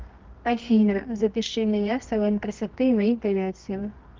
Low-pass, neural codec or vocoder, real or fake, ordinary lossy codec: 7.2 kHz; codec, 24 kHz, 0.9 kbps, WavTokenizer, medium music audio release; fake; Opus, 32 kbps